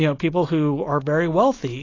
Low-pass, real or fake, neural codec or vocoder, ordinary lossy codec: 7.2 kHz; real; none; AAC, 32 kbps